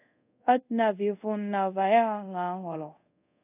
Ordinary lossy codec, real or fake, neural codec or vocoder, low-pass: AAC, 24 kbps; fake; codec, 24 kHz, 0.5 kbps, DualCodec; 3.6 kHz